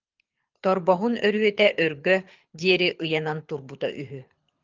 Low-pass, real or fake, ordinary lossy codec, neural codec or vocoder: 7.2 kHz; fake; Opus, 24 kbps; codec, 24 kHz, 6 kbps, HILCodec